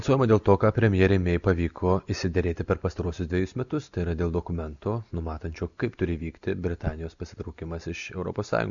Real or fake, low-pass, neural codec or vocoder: real; 7.2 kHz; none